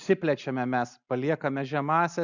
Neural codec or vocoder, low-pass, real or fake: none; 7.2 kHz; real